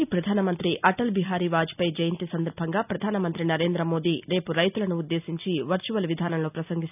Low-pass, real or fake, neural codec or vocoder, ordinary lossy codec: 3.6 kHz; real; none; none